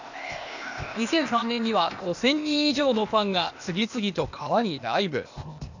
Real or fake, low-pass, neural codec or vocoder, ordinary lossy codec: fake; 7.2 kHz; codec, 16 kHz, 0.8 kbps, ZipCodec; none